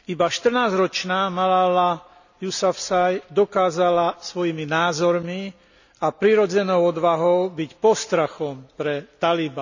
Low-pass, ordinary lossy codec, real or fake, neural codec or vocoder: 7.2 kHz; none; real; none